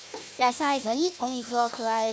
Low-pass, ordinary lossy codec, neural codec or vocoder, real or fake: none; none; codec, 16 kHz, 1 kbps, FunCodec, trained on Chinese and English, 50 frames a second; fake